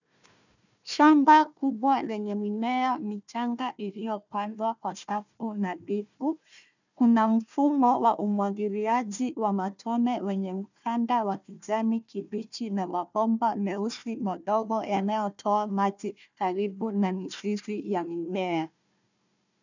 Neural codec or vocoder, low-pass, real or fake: codec, 16 kHz, 1 kbps, FunCodec, trained on Chinese and English, 50 frames a second; 7.2 kHz; fake